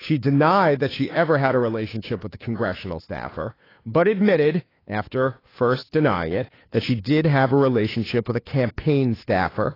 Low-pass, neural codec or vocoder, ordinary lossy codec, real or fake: 5.4 kHz; codec, 16 kHz, 2 kbps, FunCodec, trained on Chinese and English, 25 frames a second; AAC, 24 kbps; fake